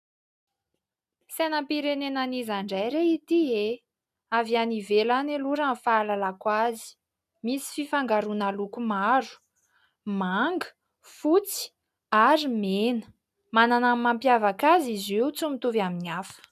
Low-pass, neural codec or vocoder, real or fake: 14.4 kHz; none; real